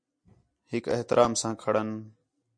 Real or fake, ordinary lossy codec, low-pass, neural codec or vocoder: real; MP3, 48 kbps; 9.9 kHz; none